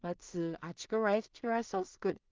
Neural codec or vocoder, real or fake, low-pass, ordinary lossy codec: codec, 16 kHz in and 24 kHz out, 0.4 kbps, LongCat-Audio-Codec, two codebook decoder; fake; 7.2 kHz; Opus, 24 kbps